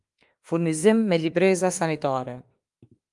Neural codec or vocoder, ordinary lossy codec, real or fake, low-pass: autoencoder, 48 kHz, 32 numbers a frame, DAC-VAE, trained on Japanese speech; Opus, 32 kbps; fake; 10.8 kHz